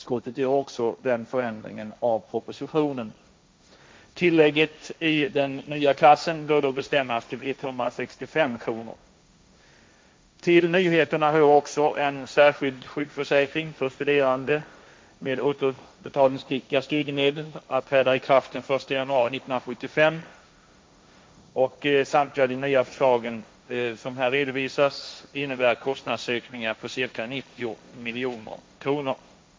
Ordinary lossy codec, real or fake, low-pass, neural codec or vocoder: none; fake; none; codec, 16 kHz, 1.1 kbps, Voila-Tokenizer